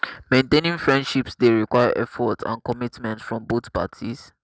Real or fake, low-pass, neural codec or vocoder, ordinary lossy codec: real; none; none; none